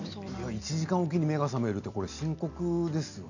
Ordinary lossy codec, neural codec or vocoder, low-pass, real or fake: none; none; 7.2 kHz; real